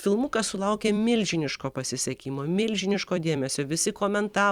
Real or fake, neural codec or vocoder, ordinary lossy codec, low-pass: fake; vocoder, 44.1 kHz, 128 mel bands every 256 samples, BigVGAN v2; Opus, 64 kbps; 19.8 kHz